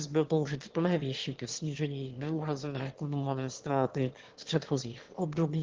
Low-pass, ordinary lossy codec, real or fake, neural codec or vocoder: 7.2 kHz; Opus, 16 kbps; fake; autoencoder, 22.05 kHz, a latent of 192 numbers a frame, VITS, trained on one speaker